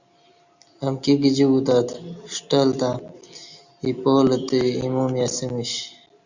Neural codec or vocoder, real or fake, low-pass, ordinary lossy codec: none; real; 7.2 kHz; Opus, 64 kbps